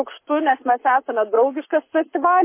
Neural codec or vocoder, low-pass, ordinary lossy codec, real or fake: codec, 44.1 kHz, 7.8 kbps, Pupu-Codec; 3.6 kHz; MP3, 24 kbps; fake